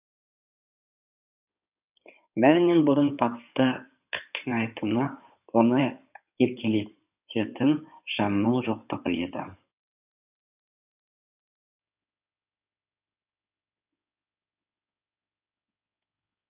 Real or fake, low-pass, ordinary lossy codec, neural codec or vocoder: fake; 3.6 kHz; none; codec, 16 kHz in and 24 kHz out, 2.2 kbps, FireRedTTS-2 codec